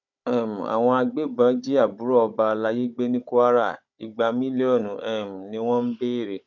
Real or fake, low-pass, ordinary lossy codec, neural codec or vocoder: fake; 7.2 kHz; none; codec, 16 kHz, 16 kbps, FunCodec, trained on Chinese and English, 50 frames a second